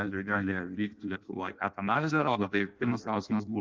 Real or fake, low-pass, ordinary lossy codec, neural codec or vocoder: fake; 7.2 kHz; Opus, 32 kbps; codec, 16 kHz in and 24 kHz out, 0.6 kbps, FireRedTTS-2 codec